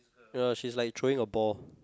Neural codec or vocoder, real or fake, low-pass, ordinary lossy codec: none; real; none; none